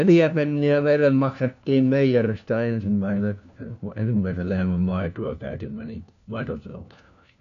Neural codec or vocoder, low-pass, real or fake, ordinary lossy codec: codec, 16 kHz, 1 kbps, FunCodec, trained on LibriTTS, 50 frames a second; 7.2 kHz; fake; none